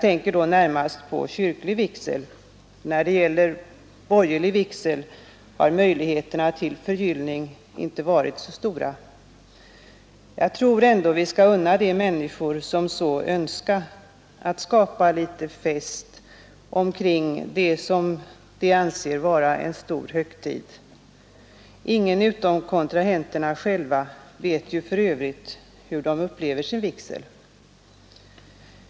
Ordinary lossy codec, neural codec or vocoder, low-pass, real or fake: none; none; none; real